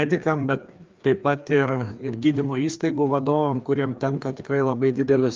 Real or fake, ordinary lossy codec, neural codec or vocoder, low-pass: fake; Opus, 24 kbps; codec, 16 kHz, 2 kbps, FreqCodec, larger model; 7.2 kHz